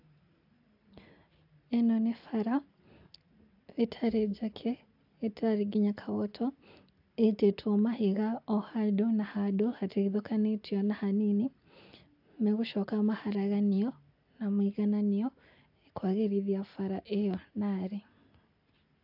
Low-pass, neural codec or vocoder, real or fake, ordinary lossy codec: 5.4 kHz; none; real; AAC, 48 kbps